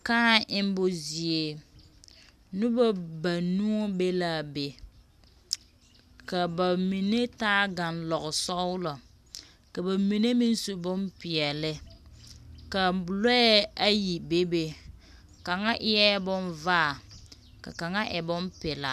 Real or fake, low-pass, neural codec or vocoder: real; 14.4 kHz; none